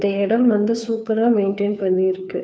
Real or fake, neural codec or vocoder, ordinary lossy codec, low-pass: fake; codec, 16 kHz, 2 kbps, FunCodec, trained on Chinese and English, 25 frames a second; none; none